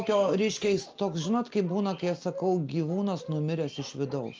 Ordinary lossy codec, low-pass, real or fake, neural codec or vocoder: Opus, 24 kbps; 7.2 kHz; real; none